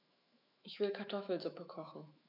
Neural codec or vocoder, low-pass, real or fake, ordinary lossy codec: autoencoder, 48 kHz, 128 numbers a frame, DAC-VAE, trained on Japanese speech; 5.4 kHz; fake; MP3, 48 kbps